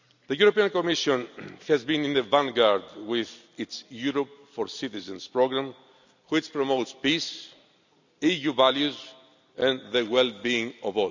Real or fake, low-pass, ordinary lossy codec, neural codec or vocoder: real; 7.2 kHz; none; none